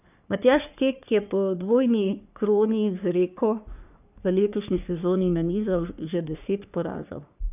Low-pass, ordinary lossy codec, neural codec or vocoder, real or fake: 3.6 kHz; none; codec, 44.1 kHz, 3.4 kbps, Pupu-Codec; fake